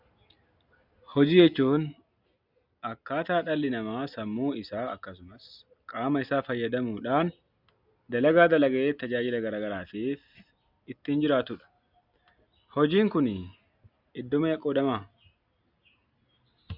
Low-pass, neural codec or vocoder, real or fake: 5.4 kHz; none; real